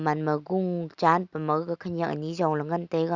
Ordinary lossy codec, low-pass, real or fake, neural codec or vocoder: Opus, 64 kbps; 7.2 kHz; real; none